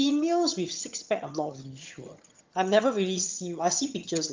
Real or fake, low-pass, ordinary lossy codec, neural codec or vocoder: fake; 7.2 kHz; Opus, 32 kbps; vocoder, 22.05 kHz, 80 mel bands, HiFi-GAN